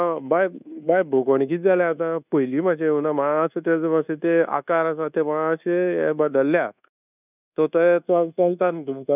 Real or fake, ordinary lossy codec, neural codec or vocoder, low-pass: fake; none; codec, 24 kHz, 1.2 kbps, DualCodec; 3.6 kHz